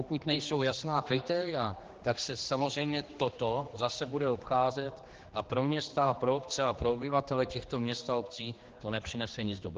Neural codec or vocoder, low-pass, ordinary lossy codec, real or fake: codec, 16 kHz, 2 kbps, X-Codec, HuBERT features, trained on general audio; 7.2 kHz; Opus, 16 kbps; fake